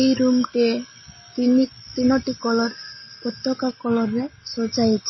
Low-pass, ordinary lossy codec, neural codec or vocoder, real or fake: 7.2 kHz; MP3, 24 kbps; none; real